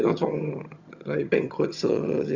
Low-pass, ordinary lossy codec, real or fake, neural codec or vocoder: 7.2 kHz; Opus, 64 kbps; fake; vocoder, 22.05 kHz, 80 mel bands, HiFi-GAN